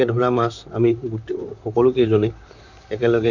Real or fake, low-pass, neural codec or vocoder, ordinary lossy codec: fake; 7.2 kHz; vocoder, 44.1 kHz, 128 mel bands, Pupu-Vocoder; none